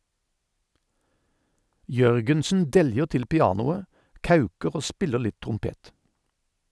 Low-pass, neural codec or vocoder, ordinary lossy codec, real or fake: none; none; none; real